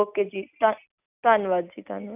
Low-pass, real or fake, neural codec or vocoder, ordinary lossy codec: 3.6 kHz; real; none; none